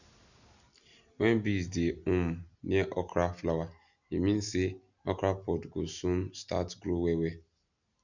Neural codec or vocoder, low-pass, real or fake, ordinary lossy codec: none; 7.2 kHz; real; none